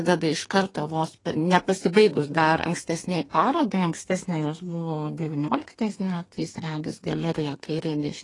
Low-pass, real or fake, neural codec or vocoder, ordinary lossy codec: 10.8 kHz; fake; codec, 32 kHz, 1.9 kbps, SNAC; AAC, 32 kbps